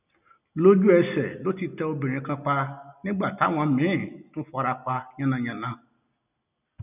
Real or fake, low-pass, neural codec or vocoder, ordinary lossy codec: real; 3.6 kHz; none; none